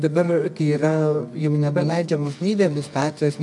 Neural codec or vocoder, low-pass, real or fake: codec, 24 kHz, 0.9 kbps, WavTokenizer, medium music audio release; 10.8 kHz; fake